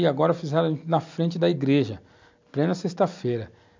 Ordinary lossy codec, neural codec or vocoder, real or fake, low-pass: MP3, 64 kbps; none; real; 7.2 kHz